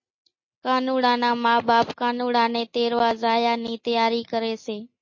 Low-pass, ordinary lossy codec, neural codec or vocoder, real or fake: 7.2 kHz; MP3, 32 kbps; none; real